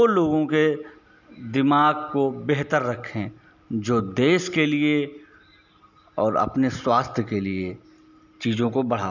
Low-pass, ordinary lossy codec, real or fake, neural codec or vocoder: 7.2 kHz; none; real; none